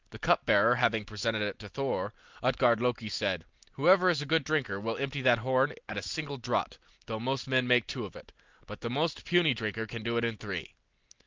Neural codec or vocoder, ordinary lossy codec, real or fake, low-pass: none; Opus, 24 kbps; real; 7.2 kHz